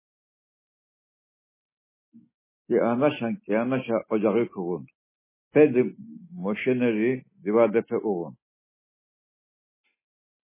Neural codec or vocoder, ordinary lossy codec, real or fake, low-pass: none; MP3, 16 kbps; real; 3.6 kHz